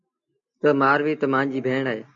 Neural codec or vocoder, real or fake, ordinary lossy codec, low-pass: none; real; MP3, 48 kbps; 7.2 kHz